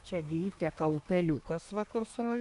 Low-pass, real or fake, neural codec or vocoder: 10.8 kHz; fake; codec, 24 kHz, 1 kbps, SNAC